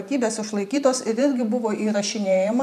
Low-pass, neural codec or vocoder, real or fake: 14.4 kHz; vocoder, 44.1 kHz, 128 mel bands every 512 samples, BigVGAN v2; fake